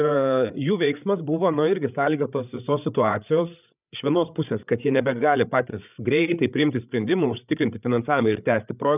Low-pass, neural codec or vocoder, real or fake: 3.6 kHz; codec, 16 kHz, 8 kbps, FreqCodec, larger model; fake